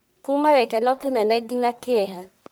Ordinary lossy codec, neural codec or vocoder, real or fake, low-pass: none; codec, 44.1 kHz, 1.7 kbps, Pupu-Codec; fake; none